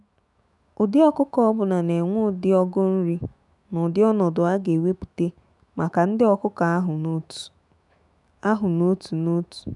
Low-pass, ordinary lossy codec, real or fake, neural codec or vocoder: 10.8 kHz; none; fake; autoencoder, 48 kHz, 128 numbers a frame, DAC-VAE, trained on Japanese speech